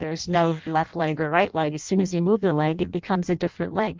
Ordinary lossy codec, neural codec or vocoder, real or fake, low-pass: Opus, 24 kbps; codec, 16 kHz in and 24 kHz out, 0.6 kbps, FireRedTTS-2 codec; fake; 7.2 kHz